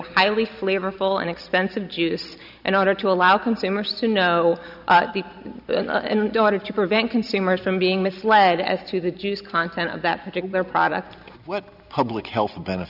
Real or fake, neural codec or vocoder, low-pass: real; none; 5.4 kHz